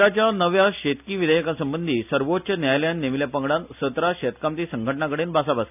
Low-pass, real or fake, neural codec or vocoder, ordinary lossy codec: 3.6 kHz; real; none; none